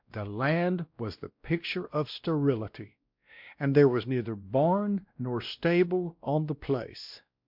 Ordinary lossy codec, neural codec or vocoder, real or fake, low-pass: MP3, 48 kbps; codec, 16 kHz, 1 kbps, X-Codec, WavLM features, trained on Multilingual LibriSpeech; fake; 5.4 kHz